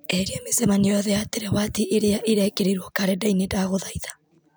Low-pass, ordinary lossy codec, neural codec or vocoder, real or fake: none; none; none; real